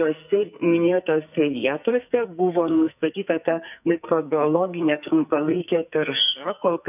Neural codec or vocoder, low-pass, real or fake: codec, 44.1 kHz, 2.6 kbps, SNAC; 3.6 kHz; fake